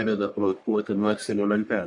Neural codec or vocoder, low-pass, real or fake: codec, 44.1 kHz, 1.7 kbps, Pupu-Codec; 10.8 kHz; fake